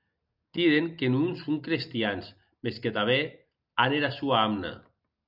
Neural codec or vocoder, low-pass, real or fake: none; 5.4 kHz; real